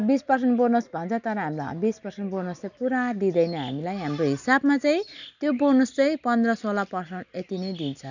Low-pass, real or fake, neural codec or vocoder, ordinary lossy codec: 7.2 kHz; real; none; none